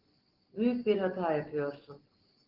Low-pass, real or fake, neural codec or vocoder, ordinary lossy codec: 5.4 kHz; real; none; Opus, 16 kbps